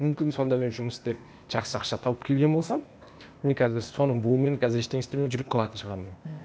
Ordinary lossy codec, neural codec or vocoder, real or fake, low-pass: none; codec, 16 kHz, 0.8 kbps, ZipCodec; fake; none